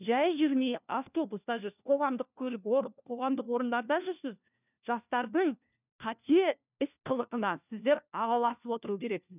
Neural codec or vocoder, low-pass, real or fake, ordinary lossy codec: codec, 16 kHz, 1 kbps, FunCodec, trained on LibriTTS, 50 frames a second; 3.6 kHz; fake; none